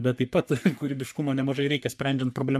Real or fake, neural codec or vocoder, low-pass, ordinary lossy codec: fake; codec, 44.1 kHz, 3.4 kbps, Pupu-Codec; 14.4 kHz; MP3, 96 kbps